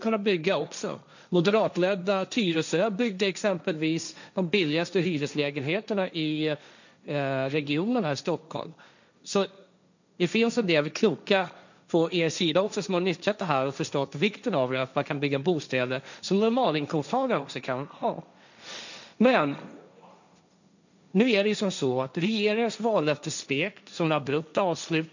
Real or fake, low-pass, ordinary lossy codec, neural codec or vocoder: fake; 7.2 kHz; none; codec, 16 kHz, 1.1 kbps, Voila-Tokenizer